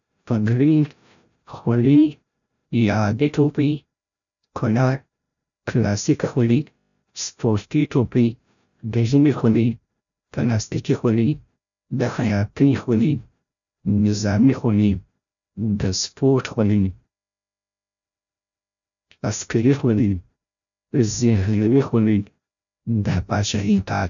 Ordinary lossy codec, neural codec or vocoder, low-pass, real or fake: none; codec, 16 kHz, 0.5 kbps, FreqCodec, larger model; 7.2 kHz; fake